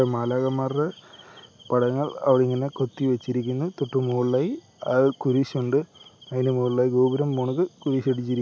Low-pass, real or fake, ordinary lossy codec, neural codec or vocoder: none; real; none; none